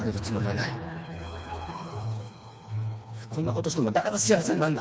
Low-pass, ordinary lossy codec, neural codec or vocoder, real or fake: none; none; codec, 16 kHz, 2 kbps, FreqCodec, smaller model; fake